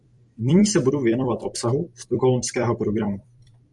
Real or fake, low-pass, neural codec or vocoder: fake; 10.8 kHz; vocoder, 44.1 kHz, 128 mel bands every 256 samples, BigVGAN v2